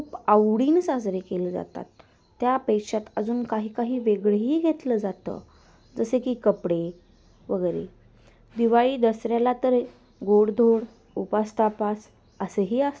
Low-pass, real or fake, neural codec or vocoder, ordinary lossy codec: none; real; none; none